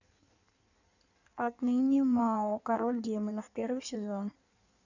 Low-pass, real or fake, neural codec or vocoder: 7.2 kHz; fake; codec, 16 kHz in and 24 kHz out, 1.1 kbps, FireRedTTS-2 codec